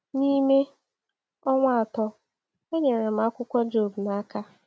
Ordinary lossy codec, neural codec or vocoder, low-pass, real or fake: none; none; none; real